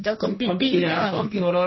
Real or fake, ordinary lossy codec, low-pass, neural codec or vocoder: fake; MP3, 24 kbps; 7.2 kHz; codec, 16 kHz, 1.1 kbps, Voila-Tokenizer